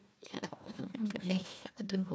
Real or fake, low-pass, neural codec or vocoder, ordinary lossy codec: fake; none; codec, 16 kHz, 1 kbps, FunCodec, trained on Chinese and English, 50 frames a second; none